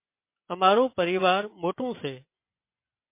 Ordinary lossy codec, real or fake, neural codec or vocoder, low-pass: MP3, 24 kbps; fake; vocoder, 24 kHz, 100 mel bands, Vocos; 3.6 kHz